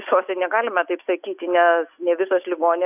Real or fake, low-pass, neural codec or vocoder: real; 3.6 kHz; none